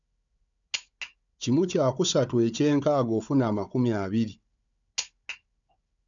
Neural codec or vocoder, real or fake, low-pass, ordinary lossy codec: codec, 16 kHz, 4 kbps, FunCodec, trained on Chinese and English, 50 frames a second; fake; 7.2 kHz; none